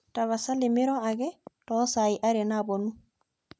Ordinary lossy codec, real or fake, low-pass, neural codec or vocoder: none; real; none; none